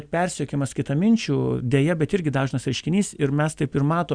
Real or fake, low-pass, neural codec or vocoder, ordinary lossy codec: real; 9.9 kHz; none; Opus, 64 kbps